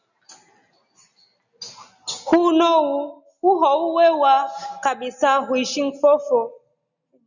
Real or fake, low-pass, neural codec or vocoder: real; 7.2 kHz; none